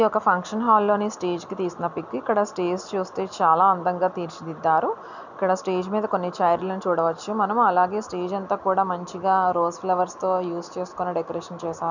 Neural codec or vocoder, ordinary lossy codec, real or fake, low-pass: none; none; real; 7.2 kHz